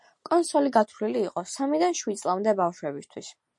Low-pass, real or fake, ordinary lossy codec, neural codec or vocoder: 10.8 kHz; real; MP3, 48 kbps; none